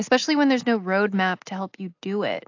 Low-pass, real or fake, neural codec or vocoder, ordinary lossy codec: 7.2 kHz; real; none; AAC, 48 kbps